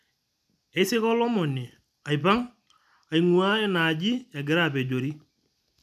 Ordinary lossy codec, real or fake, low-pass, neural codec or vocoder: none; real; 14.4 kHz; none